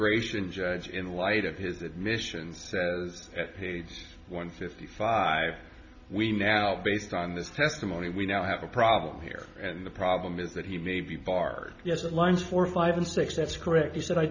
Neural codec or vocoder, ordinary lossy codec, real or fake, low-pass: none; MP3, 64 kbps; real; 7.2 kHz